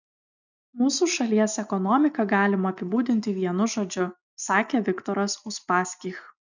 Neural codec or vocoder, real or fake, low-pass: none; real; 7.2 kHz